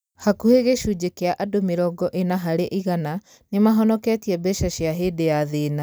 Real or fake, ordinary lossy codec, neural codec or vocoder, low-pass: real; none; none; none